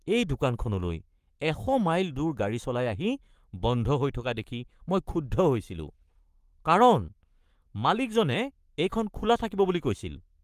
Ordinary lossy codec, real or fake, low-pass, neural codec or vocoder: Opus, 32 kbps; fake; 14.4 kHz; codec, 44.1 kHz, 7.8 kbps, Pupu-Codec